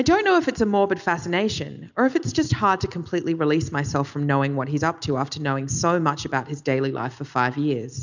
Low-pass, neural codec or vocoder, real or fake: 7.2 kHz; none; real